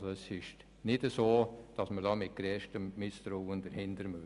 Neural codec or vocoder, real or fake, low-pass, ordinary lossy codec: none; real; 10.8 kHz; none